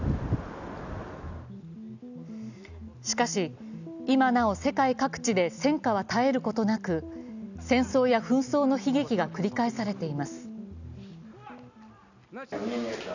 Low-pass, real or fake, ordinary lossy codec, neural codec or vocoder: 7.2 kHz; real; none; none